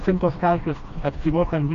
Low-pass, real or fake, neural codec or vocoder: 7.2 kHz; fake; codec, 16 kHz, 1 kbps, FreqCodec, smaller model